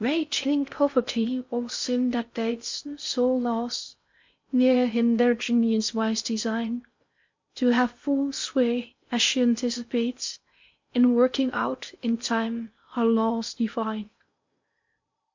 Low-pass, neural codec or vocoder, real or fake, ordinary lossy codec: 7.2 kHz; codec, 16 kHz in and 24 kHz out, 0.6 kbps, FocalCodec, streaming, 4096 codes; fake; MP3, 48 kbps